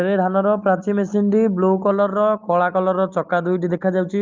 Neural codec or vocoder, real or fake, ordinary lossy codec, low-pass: none; real; Opus, 32 kbps; 7.2 kHz